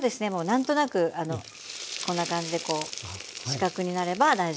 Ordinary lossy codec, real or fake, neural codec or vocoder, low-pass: none; real; none; none